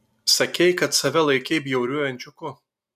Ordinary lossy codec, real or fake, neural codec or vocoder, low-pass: MP3, 96 kbps; real; none; 14.4 kHz